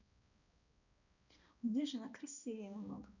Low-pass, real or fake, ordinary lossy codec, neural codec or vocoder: 7.2 kHz; fake; none; codec, 16 kHz, 1 kbps, X-Codec, HuBERT features, trained on balanced general audio